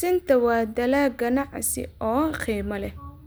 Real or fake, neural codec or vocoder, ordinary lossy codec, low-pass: real; none; none; none